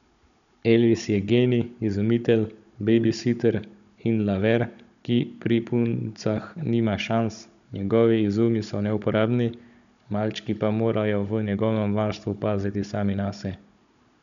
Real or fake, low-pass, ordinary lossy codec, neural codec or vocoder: fake; 7.2 kHz; none; codec, 16 kHz, 16 kbps, FunCodec, trained on Chinese and English, 50 frames a second